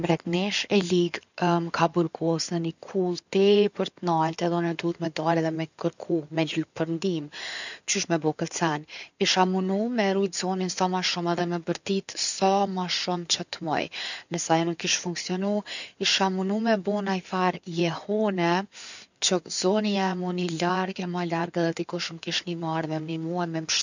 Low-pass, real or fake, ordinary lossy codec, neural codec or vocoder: 7.2 kHz; fake; none; codec, 16 kHz in and 24 kHz out, 2.2 kbps, FireRedTTS-2 codec